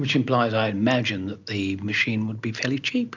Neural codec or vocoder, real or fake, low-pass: none; real; 7.2 kHz